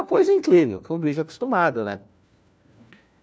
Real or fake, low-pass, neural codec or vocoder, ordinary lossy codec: fake; none; codec, 16 kHz, 1 kbps, FunCodec, trained on LibriTTS, 50 frames a second; none